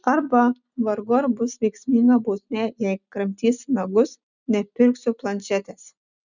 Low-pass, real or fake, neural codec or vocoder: 7.2 kHz; real; none